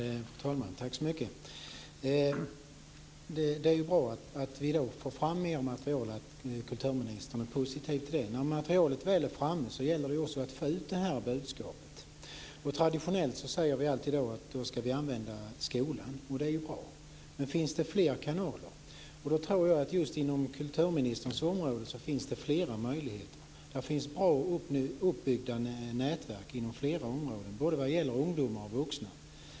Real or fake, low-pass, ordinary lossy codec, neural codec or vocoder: real; none; none; none